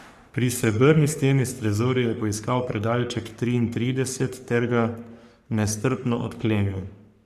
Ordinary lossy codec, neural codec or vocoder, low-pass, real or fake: Opus, 64 kbps; codec, 44.1 kHz, 3.4 kbps, Pupu-Codec; 14.4 kHz; fake